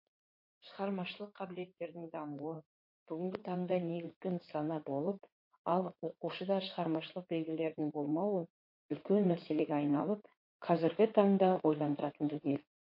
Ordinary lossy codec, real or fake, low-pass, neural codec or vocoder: MP3, 32 kbps; fake; 5.4 kHz; codec, 16 kHz in and 24 kHz out, 2.2 kbps, FireRedTTS-2 codec